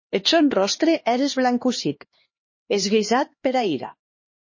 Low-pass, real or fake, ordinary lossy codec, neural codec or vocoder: 7.2 kHz; fake; MP3, 32 kbps; codec, 16 kHz, 2 kbps, X-Codec, HuBERT features, trained on LibriSpeech